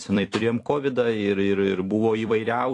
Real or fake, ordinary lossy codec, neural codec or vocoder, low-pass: fake; AAC, 48 kbps; vocoder, 44.1 kHz, 128 mel bands every 256 samples, BigVGAN v2; 10.8 kHz